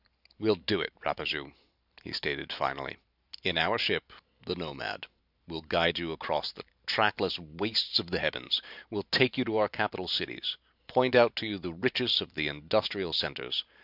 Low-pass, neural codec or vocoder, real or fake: 5.4 kHz; vocoder, 44.1 kHz, 128 mel bands every 512 samples, BigVGAN v2; fake